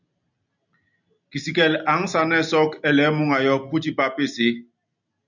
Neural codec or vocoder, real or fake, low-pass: none; real; 7.2 kHz